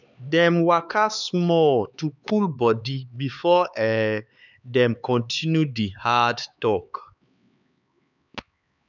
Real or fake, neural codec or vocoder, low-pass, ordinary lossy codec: fake; codec, 16 kHz, 4 kbps, X-Codec, HuBERT features, trained on LibriSpeech; 7.2 kHz; none